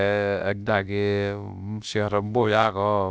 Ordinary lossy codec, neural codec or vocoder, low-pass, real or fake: none; codec, 16 kHz, about 1 kbps, DyCAST, with the encoder's durations; none; fake